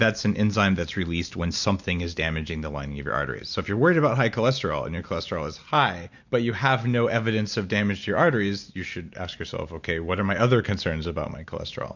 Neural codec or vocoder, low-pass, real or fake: none; 7.2 kHz; real